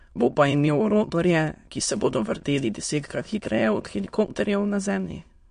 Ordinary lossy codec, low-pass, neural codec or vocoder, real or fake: MP3, 48 kbps; 9.9 kHz; autoencoder, 22.05 kHz, a latent of 192 numbers a frame, VITS, trained on many speakers; fake